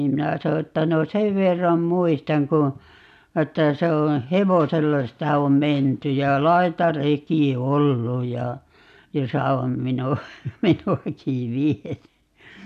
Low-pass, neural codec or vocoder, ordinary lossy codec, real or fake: 14.4 kHz; none; none; real